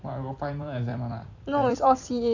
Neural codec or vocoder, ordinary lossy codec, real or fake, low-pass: vocoder, 44.1 kHz, 128 mel bands every 256 samples, BigVGAN v2; none; fake; 7.2 kHz